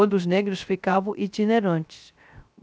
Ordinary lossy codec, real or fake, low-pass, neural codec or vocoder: none; fake; none; codec, 16 kHz, 0.3 kbps, FocalCodec